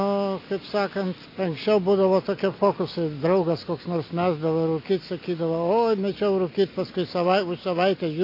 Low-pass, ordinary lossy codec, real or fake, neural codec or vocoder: 5.4 kHz; AAC, 32 kbps; real; none